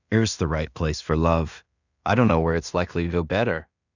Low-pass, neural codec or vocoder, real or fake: 7.2 kHz; codec, 16 kHz in and 24 kHz out, 0.4 kbps, LongCat-Audio-Codec, two codebook decoder; fake